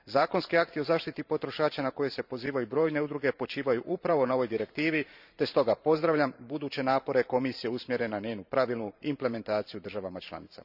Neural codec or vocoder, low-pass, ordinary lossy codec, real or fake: vocoder, 44.1 kHz, 128 mel bands every 512 samples, BigVGAN v2; 5.4 kHz; none; fake